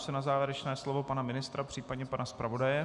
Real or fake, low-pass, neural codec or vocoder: real; 10.8 kHz; none